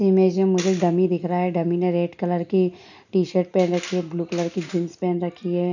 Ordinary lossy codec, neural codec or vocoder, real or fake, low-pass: none; none; real; 7.2 kHz